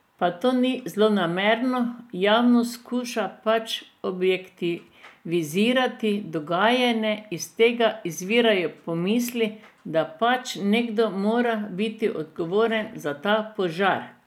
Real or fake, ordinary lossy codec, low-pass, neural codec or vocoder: real; none; 19.8 kHz; none